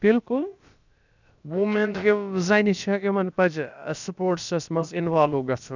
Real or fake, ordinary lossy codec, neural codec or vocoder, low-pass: fake; none; codec, 16 kHz, about 1 kbps, DyCAST, with the encoder's durations; 7.2 kHz